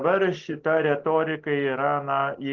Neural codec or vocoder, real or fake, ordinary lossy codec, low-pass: none; real; Opus, 16 kbps; 7.2 kHz